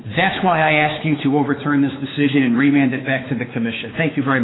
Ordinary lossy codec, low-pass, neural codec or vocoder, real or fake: AAC, 16 kbps; 7.2 kHz; codec, 16 kHz, 4 kbps, X-Codec, WavLM features, trained on Multilingual LibriSpeech; fake